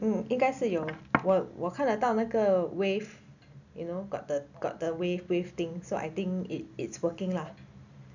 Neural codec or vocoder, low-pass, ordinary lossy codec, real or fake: none; 7.2 kHz; none; real